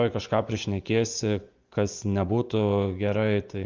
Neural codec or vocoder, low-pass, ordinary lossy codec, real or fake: vocoder, 24 kHz, 100 mel bands, Vocos; 7.2 kHz; Opus, 24 kbps; fake